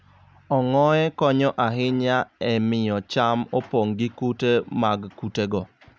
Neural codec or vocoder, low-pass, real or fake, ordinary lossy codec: none; none; real; none